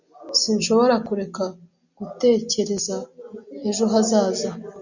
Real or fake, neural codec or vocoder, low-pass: real; none; 7.2 kHz